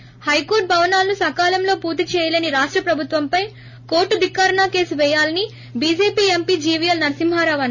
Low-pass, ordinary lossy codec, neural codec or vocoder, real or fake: 7.2 kHz; none; none; real